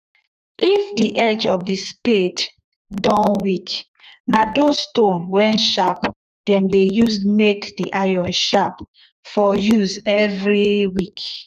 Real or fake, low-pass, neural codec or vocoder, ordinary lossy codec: fake; 14.4 kHz; codec, 32 kHz, 1.9 kbps, SNAC; none